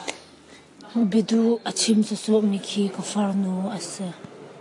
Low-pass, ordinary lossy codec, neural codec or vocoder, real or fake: 10.8 kHz; AAC, 48 kbps; none; real